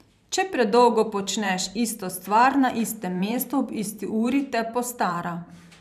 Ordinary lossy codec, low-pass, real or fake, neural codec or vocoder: none; 14.4 kHz; fake; vocoder, 44.1 kHz, 128 mel bands every 512 samples, BigVGAN v2